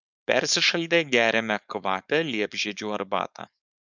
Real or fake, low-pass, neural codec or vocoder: fake; 7.2 kHz; codec, 16 kHz, 4.8 kbps, FACodec